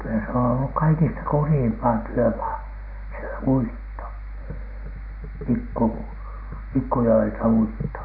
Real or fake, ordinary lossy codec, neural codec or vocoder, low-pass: real; MP3, 48 kbps; none; 5.4 kHz